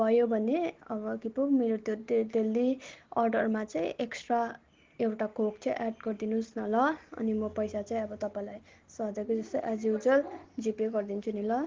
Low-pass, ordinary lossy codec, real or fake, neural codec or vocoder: 7.2 kHz; Opus, 16 kbps; real; none